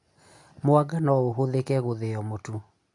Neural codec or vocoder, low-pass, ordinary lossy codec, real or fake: none; 10.8 kHz; AAC, 64 kbps; real